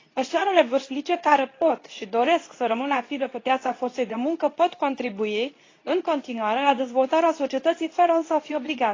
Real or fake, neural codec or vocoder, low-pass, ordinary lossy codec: fake; codec, 24 kHz, 0.9 kbps, WavTokenizer, medium speech release version 2; 7.2 kHz; AAC, 32 kbps